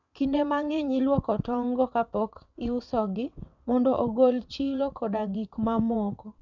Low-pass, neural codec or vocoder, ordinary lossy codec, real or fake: 7.2 kHz; vocoder, 22.05 kHz, 80 mel bands, WaveNeXt; none; fake